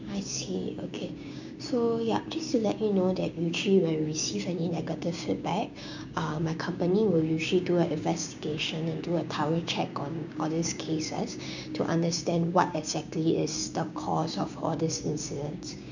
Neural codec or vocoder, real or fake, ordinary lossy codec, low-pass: codec, 16 kHz, 6 kbps, DAC; fake; none; 7.2 kHz